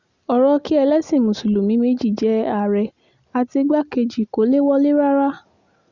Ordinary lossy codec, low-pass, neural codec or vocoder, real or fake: Opus, 64 kbps; 7.2 kHz; none; real